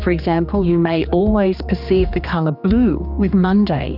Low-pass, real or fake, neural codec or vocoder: 5.4 kHz; fake; codec, 16 kHz, 2 kbps, X-Codec, HuBERT features, trained on general audio